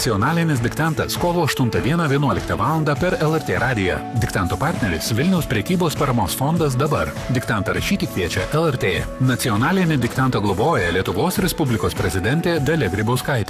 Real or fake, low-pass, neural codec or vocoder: fake; 14.4 kHz; codec, 44.1 kHz, 7.8 kbps, Pupu-Codec